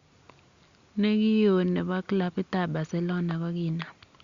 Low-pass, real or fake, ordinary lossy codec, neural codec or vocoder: 7.2 kHz; real; Opus, 64 kbps; none